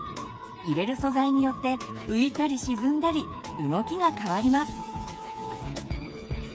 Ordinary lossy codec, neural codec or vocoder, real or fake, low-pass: none; codec, 16 kHz, 4 kbps, FreqCodec, smaller model; fake; none